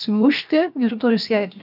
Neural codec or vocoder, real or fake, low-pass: codec, 16 kHz, 0.7 kbps, FocalCodec; fake; 5.4 kHz